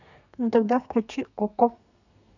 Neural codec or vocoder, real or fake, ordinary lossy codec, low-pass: codec, 32 kHz, 1.9 kbps, SNAC; fake; none; 7.2 kHz